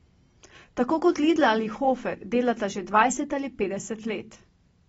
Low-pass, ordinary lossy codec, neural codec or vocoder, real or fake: 19.8 kHz; AAC, 24 kbps; none; real